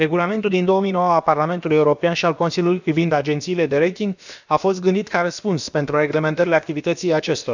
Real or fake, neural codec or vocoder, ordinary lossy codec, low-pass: fake; codec, 16 kHz, about 1 kbps, DyCAST, with the encoder's durations; none; 7.2 kHz